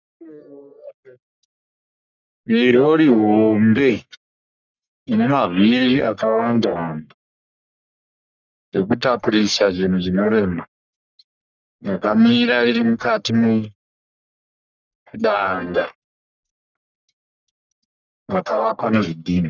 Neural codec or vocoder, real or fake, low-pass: codec, 44.1 kHz, 1.7 kbps, Pupu-Codec; fake; 7.2 kHz